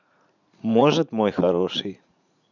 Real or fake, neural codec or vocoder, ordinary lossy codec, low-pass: fake; vocoder, 44.1 kHz, 80 mel bands, Vocos; none; 7.2 kHz